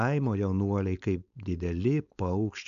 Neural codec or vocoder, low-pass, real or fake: codec, 16 kHz, 4.8 kbps, FACodec; 7.2 kHz; fake